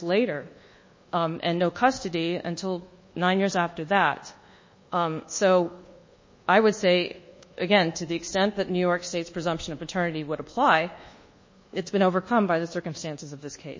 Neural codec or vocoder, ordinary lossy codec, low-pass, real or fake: codec, 24 kHz, 1.2 kbps, DualCodec; MP3, 32 kbps; 7.2 kHz; fake